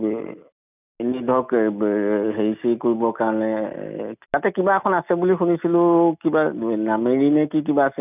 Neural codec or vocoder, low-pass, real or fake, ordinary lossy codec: none; 3.6 kHz; real; none